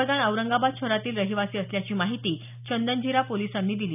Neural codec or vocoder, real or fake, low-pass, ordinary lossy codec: none; real; 3.6 kHz; none